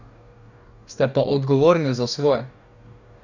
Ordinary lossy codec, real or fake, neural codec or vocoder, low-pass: none; fake; codec, 44.1 kHz, 2.6 kbps, DAC; 7.2 kHz